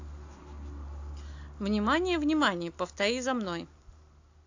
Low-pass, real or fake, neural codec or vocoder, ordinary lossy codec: 7.2 kHz; real; none; AAC, 48 kbps